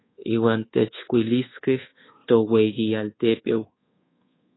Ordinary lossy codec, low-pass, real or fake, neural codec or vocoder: AAC, 16 kbps; 7.2 kHz; fake; codec, 24 kHz, 1.2 kbps, DualCodec